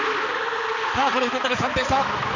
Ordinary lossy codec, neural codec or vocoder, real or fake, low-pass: none; codec, 16 kHz, 4 kbps, X-Codec, HuBERT features, trained on balanced general audio; fake; 7.2 kHz